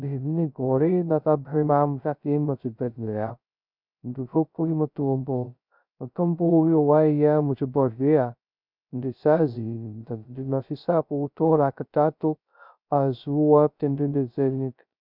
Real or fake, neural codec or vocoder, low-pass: fake; codec, 16 kHz, 0.2 kbps, FocalCodec; 5.4 kHz